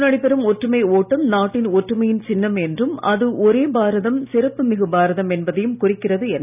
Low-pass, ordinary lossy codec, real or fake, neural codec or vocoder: 3.6 kHz; none; real; none